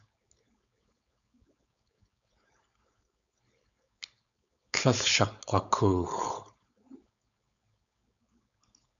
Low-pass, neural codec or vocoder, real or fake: 7.2 kHz; codec, 16 kHz, 4.8 kbps, FACodec; fake